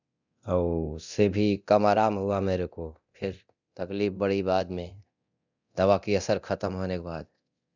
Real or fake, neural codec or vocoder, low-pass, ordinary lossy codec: fake; codec, 24 kHz, 0.9 kbps, DualCodec; 7.2 kHz; none